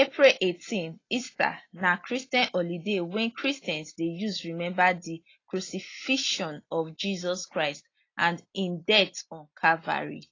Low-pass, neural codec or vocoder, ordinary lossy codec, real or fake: 7.2 kHz; none; AAC, 32 kbps; real